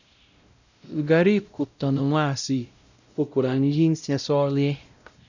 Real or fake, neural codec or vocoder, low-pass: fake; codec, 16 kHz, 0.5 kbps, X-Codec, WavLM features, trained on Multilingual LibriSpeech; 7.2 kHz